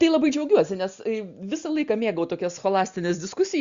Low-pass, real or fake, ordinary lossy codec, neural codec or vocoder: 7.2 kHz; real; Opus, 64 kbps; none